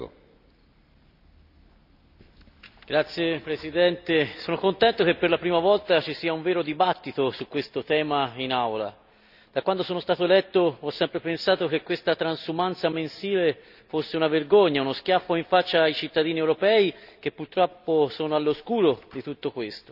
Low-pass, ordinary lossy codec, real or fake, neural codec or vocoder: 5.4 kHz; none; real; none